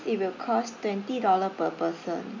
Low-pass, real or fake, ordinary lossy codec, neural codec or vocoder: 7.2 kHz; real; MP3, 64 kbps; none